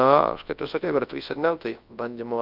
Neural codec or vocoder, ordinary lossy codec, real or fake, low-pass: codec, 24 kHz, 0.9 kbps, WavTokenizer, large speech release; Opus, 24 kbps; fake; 5.4 kHz